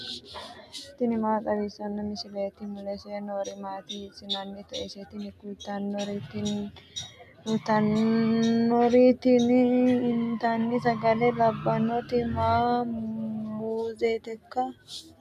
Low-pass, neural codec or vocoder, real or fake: 14.4 kHz; none; real